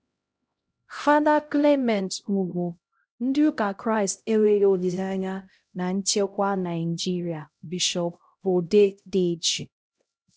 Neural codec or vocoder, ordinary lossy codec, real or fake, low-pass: codec, 16 kHz, 0.5 kbps, X-Codec, HuBERT features, trained on LibriSpeech; none; fake; none